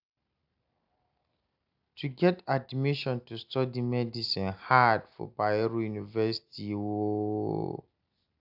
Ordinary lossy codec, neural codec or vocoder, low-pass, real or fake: AAC, 48 kbps; none; 5.4 kHz; real